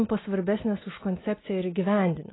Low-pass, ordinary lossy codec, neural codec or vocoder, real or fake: 7.2 kHz; AAC, 16 kbps; none; real